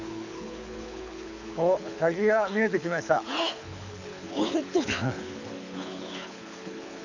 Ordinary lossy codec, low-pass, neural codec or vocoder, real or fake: none; 7.2 kHz; codec, 24 kHz, 6 kbps, HILCodec; fake